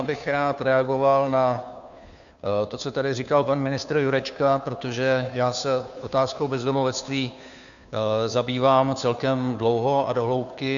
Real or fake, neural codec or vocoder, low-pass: fake; codec, 16 kHz, 2 kbps, FunCodec, trained on Chinese and English, 25 frames a second; 7.2 kHz